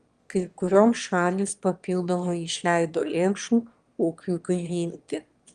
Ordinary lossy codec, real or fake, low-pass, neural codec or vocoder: Opus, 32 kbps; fake; 9.9 kHz; autoencoder, 22.05 kHz, a latent of 192 numbers a frame, VITS, trained on one speaker